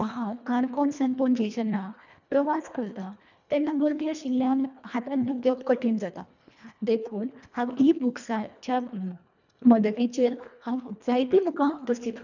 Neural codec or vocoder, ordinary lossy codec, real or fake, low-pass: codec, 24 kHz, 1.5 kbps, HILCodec; none; fake; 7.2 kHz